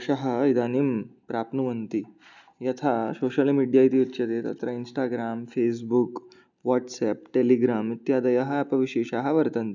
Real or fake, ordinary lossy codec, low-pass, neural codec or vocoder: real; none; 7.2 kHz; none